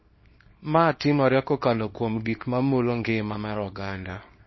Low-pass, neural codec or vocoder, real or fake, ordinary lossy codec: 7.2 kHz; codec, 24 kHz, 0.9 kbps, WavTokenizer, small release; fake; MP3, 24 kbps